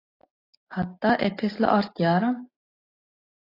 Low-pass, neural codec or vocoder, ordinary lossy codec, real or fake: 5.4 kHz; none; AAC, 24 kbps; real